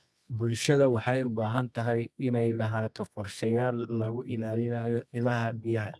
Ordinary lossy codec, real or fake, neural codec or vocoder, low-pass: none; fake; codec, 24 kHz, 0.9 kbps, WavTokenizer, medium music audio release; none